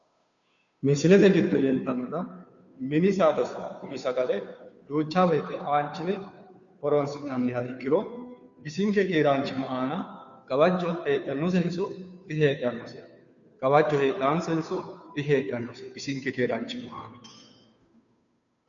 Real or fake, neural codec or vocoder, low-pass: fake; codec, 16 kHz, 2 kbps, FunCodec, trained on Chinese and English, 25 frames a second; 7.2 kHz